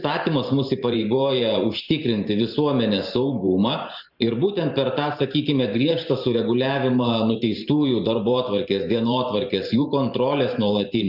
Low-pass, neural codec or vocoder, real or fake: 5.4 kHz; vocoder, 44.1 kHz, 128 mel bands every 512 samples, BigVGAN v2; fake